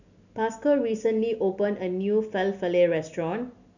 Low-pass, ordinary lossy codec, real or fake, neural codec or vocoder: 7.2 kHz; none; real; none